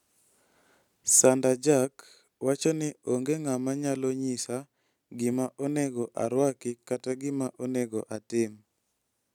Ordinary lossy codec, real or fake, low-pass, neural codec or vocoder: none; real; 19.8 kHz; none